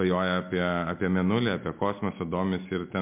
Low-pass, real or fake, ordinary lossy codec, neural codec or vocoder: 3.6 kHz; real; MP3, 32 kbps; none